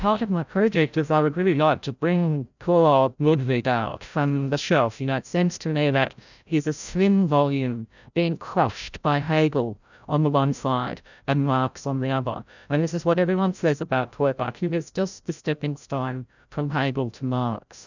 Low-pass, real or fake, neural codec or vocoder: 7.2 kHz; fake; codec, 16 kHz, 0.5 kbps, FreqCodec, larger model